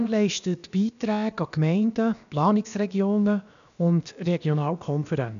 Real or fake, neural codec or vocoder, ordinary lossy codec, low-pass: fake; codec, 16 kHz, about 1 kbps, DyCAST, with the encoder's durations; none; 7.2 kHz